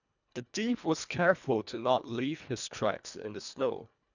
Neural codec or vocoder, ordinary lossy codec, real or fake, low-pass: codec, 24 kHz, 1.5 kbps, HILCodec; none; fake; 7.2 kHz